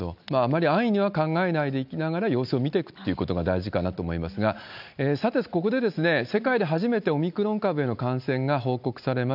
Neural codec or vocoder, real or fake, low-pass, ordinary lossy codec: none; real; 5.4 kHz; none